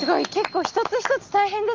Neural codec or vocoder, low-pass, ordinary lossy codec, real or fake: none; 7.2 kHz; Opus, 24 kbps; real